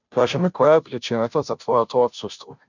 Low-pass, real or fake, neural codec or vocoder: 7.2 kHz; fake; codec, 16 kHz, 0.5 kbps, FunCodec, trained on Chinese and English, 25 frames a second